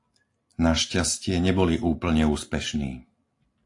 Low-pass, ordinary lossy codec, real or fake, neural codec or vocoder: 10.8 kHz; AAC, 48 kbps; real; none